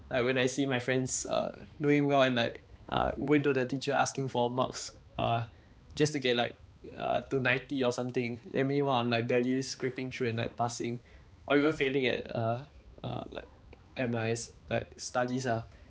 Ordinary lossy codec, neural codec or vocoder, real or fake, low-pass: none; codec, 16 kHz, 2 kbps, X-Codec, HuBERT features, trained on balanced general audio; fake; none